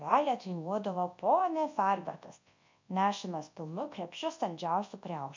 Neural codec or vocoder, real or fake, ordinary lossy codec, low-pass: codec, 24 kHz, 0.9 kbps, WavTokenizer, large speech release; fake; MP3, 48 kbps; 7.2 kHz